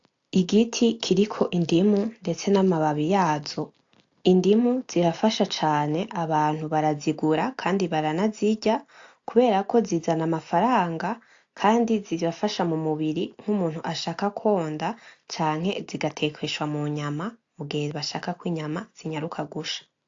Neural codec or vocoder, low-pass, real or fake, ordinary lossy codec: none; 7.2 kHz; real; AAC, 48 kbps